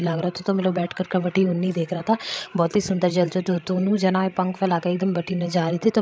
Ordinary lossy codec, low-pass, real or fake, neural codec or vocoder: none; none; fake; codec, 16 kHz, 16 kbps, FreqCodec, larger model